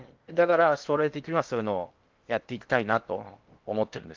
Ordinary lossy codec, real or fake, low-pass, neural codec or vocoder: Opus, 32 kbps; fake; 7.2 kHz; codec, 16 kHz in and 24 kHz out, 0.8 kbps, FocalCodec, streaming, 65536 codes